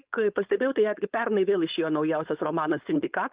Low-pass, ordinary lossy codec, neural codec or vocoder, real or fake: 3.6 kHz; Opus, 32 kbps; codec, 16 kHz, 4.8 kbps, FACodec; fake